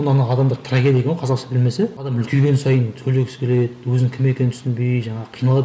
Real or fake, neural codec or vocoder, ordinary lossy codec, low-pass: real; none; none; none